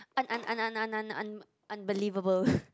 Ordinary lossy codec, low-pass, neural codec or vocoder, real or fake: none; none; none; real